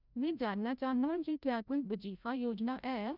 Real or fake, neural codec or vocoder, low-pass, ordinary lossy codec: fake; codec, 16 kHz, 0.5 kbps, FreqCodec, larger model; 5.4 kHz; none